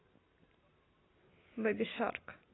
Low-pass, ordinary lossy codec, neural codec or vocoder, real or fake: 7.2 kHz; AAC, 16 kbps; none; real